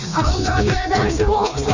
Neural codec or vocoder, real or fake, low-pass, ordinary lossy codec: codec, 16 kHz, 2 kbps, FreqCodec, smaller model; fake; 7.2 kHz; none